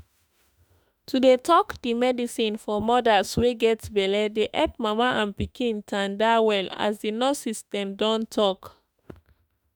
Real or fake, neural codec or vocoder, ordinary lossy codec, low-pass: fake; autoencoder, 48 kHz, 32 numbers a frame, DAC-VAE, trained on Japanese speech; none; none